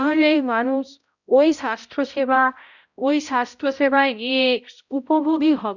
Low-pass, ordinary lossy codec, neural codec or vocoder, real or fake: 7.2 kHz; none; codec, 16 kHz, 0.5 kbps, X-Codec, HuBERT features, trained on balanced general audio; fake